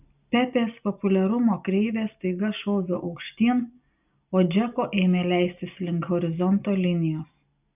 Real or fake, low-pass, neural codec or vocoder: real; 3.6 kHz; none